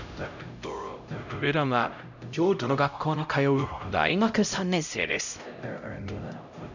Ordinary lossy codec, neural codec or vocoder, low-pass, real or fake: none; codec, 16 kHz, 0.5 kbps, X-Codec, HuBERT features, trained on LibriSpeech; 7.2 kHz; fake